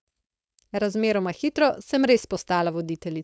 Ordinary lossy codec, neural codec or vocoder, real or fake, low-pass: none; codec, 16 kHz, 4.8 kbps, FACodec; fake; none